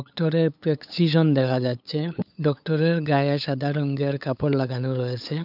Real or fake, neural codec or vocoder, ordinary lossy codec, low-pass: fake; codec, 16 kHz, 8 kbps, FunCodec, trained on LibriTTS, 25 frames a second; none; 5.4 kHz